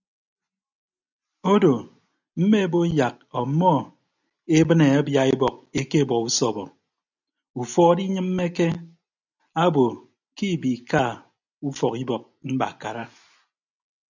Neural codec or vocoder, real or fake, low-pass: none; real; 7.2 kHz